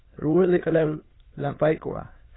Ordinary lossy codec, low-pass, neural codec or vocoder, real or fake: AAC, 16 kbps; 7.2 kHz; autoencoder, 22.05 kHz, a latent of 192 numbers a frame, VITS, trained on many speakers; fake